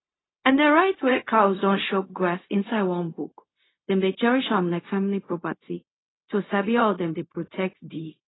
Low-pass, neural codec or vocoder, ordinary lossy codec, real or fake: 7.2 kHz; codec, 16 kHz, 0.4 kbps, LongCat-Audio-Codec; AAC, 16 kbps; fake